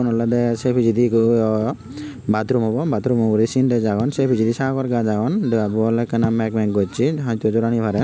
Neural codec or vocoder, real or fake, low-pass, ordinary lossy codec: none; real; none; none